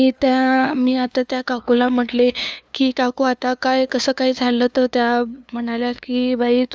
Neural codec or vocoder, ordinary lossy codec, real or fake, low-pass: codec, 16 kHz, 2 kbps, FunCodec, trained on LibriTTS, 25 frames a second; none; fake; none